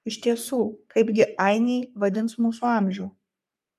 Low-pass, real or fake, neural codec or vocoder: 14.4 kHz; fake; codec, 44.1 kHz, 3.4 kbps, Pupu-Codec